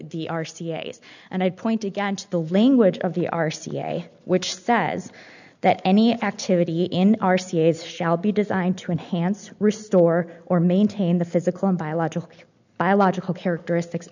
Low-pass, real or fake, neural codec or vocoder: 7.2 kHz; real; none